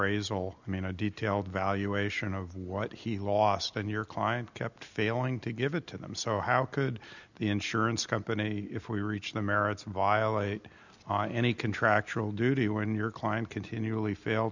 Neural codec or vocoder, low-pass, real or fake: none; 7.2 kHz; real